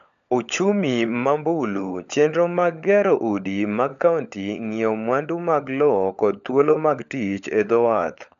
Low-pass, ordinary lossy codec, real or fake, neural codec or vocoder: 7.2 kHz; none; fake; codec, 16 kHz, 16 kbps, FunCodec, trained on LibriTTS, 50 frames a second